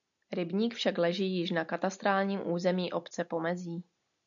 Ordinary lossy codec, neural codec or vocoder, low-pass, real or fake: MP3, 96 kbps; none; 7.2 kHz; real